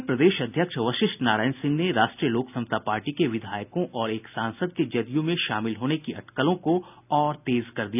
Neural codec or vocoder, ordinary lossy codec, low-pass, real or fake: none; none; 3.6 kHz; real